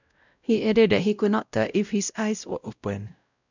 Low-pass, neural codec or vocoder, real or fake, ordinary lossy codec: 7.2 kHz; codec, 16 kHz, 0.5 kbps, X-Codec, WavLM features, trained on Multilingual LibriSpeech; fake; none